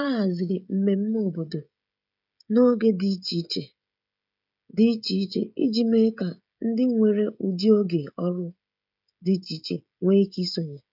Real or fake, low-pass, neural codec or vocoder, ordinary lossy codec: fake; 5.4 kHz; codec, 16 kHz, 16 kbps, FreqCodec, smaller model; none